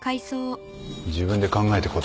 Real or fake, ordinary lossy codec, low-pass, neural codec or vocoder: real; none; none; none